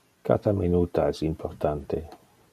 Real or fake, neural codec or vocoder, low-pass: real; none; 14.4 kHz